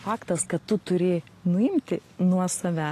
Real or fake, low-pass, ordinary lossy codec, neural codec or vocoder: real; 14.4 kHz; AAC, 48 kbps; none